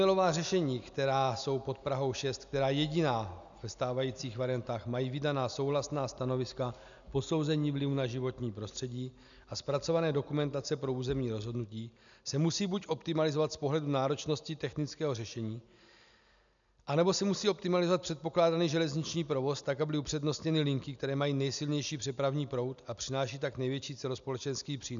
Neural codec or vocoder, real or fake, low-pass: none; real; 7.2 kHz